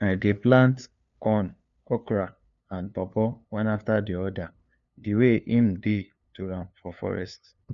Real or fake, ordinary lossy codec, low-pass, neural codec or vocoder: fake; none; 7.2 kHz; codec, 16 kHz, 2 kbps, FunCodec, trained on LibriTTS, 25 frames a second